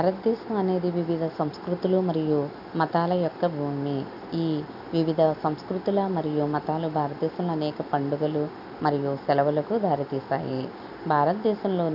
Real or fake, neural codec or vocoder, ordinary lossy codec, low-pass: real; none; none; 5.4 kHz